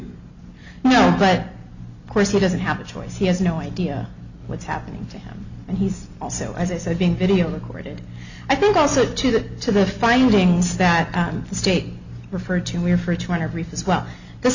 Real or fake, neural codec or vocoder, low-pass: real; none; 7.2 kHz